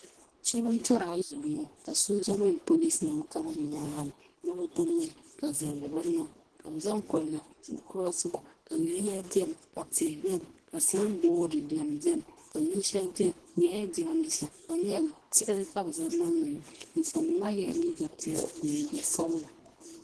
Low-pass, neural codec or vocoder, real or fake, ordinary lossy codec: 10.8 kHz; codec, 24 kHz, 1.5 kbps, HILCodec; fake; Opus, 16 kbps